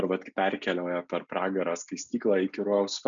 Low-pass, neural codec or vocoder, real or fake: 7.2 kHz; none; real